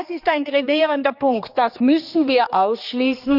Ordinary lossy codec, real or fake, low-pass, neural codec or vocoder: none; fake; 5.4 kHz; codec, 16 kHz, 2 kbps, X-Codec, HuBERT features, trained on general audio